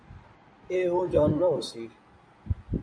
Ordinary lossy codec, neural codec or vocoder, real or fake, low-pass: MP3, 48 kbps; codec, 16 kHz in and 24 kHz out, 2.2 kbps, FireRedTTS-2 codec; fake; 9.9 kHz